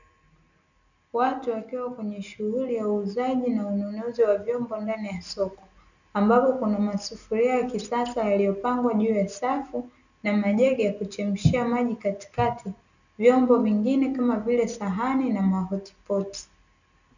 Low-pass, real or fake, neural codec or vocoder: 7.2 kHz; real; none